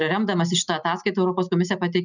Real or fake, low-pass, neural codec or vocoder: real; 7.2 kHz; none